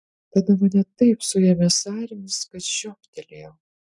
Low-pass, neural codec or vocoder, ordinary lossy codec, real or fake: 10.8 kHz; none; MP3, 96 kbps; real